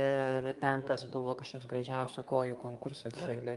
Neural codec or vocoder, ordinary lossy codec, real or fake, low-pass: codec, 24 kHz, 1 kbps, SNAC; Opus, 32 kbps; fake; 10.8 kHz